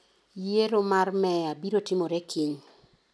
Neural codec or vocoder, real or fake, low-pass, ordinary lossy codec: none; real; none; none